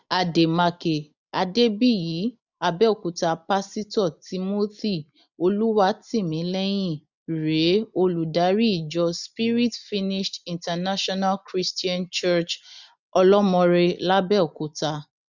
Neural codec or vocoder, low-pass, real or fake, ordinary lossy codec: none; 7.2 kHz; real; none